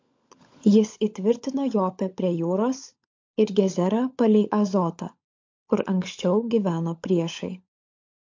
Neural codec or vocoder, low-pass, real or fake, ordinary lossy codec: codec, 16 kHz, 16 kbps, FunCodec, trained on LibriTTS, 50 frames a second; 7.2 kHz; fake; MP3, 48 kbps